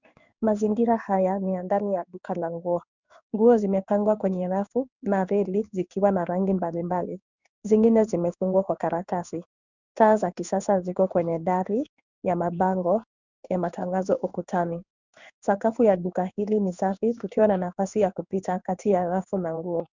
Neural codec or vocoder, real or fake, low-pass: codec, 16 kHz in and 24 kHz out, 1 kbps, XY-Tokenizer; fake; 7.2 kHz